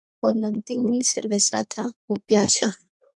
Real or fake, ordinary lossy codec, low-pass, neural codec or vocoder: fake; MP3, 96 kbps; 10.8 kHz; codec, 24 kHz, 1 kbps, SNAC